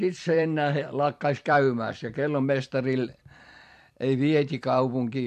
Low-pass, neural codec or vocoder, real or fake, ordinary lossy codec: 14.4 kHz; vocoder, 44.1 kHz, 128 mel bands every 256 samples, BigVGAN v2; fake; MP3, 64 kbps